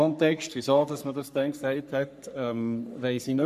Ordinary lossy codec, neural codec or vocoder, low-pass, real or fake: none; codec, 44.1 kHz, 3.4 kbps, Pupu-Codec; 14.4 kHz; fake